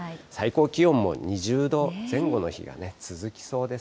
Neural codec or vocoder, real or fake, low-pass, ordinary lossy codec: none; real; none; none